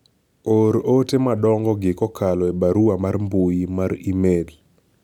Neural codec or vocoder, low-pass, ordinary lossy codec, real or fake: none; 19.8 kHz; none; real